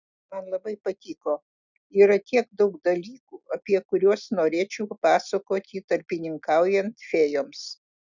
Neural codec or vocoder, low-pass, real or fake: none; 7.2 kHz; real